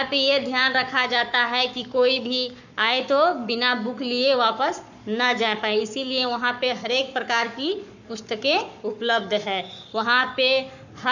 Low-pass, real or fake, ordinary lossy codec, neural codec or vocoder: 7.2 kHz; fake; none; codec, 44.1 kHz, 7.8 kbps, Pupu-Codec